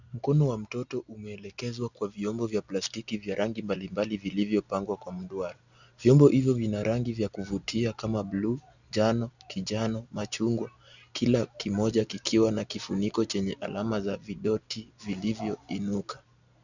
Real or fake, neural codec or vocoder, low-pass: real; none; 7.2 kHz